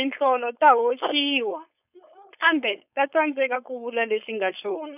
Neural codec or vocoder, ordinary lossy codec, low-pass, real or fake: codec, 16 kHz, 4.8 kbps, FACodec; none; 3.6 kHz; fake